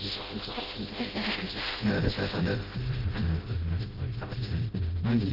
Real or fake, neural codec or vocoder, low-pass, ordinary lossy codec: fake; codec, 16 kHz, 0.5 kbps, FreqCodec, smaller model; 5.4 kHz; Opus, 16 kbps